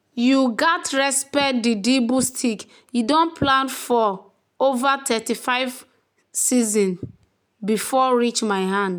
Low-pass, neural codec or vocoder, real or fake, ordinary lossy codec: none; none; real; none